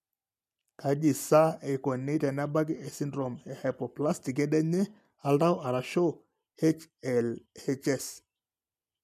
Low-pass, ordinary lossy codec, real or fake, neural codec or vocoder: 14.4 kHz; none; real; none